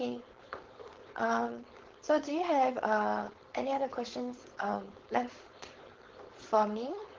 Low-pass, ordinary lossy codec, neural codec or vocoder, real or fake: 7.2 kHz; Opus, 16 kbps; codec, 16 kHz, 4.8 kbps, FACodec; fake